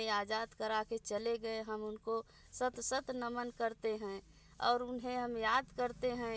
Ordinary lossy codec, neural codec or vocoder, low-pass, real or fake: none; none; none; real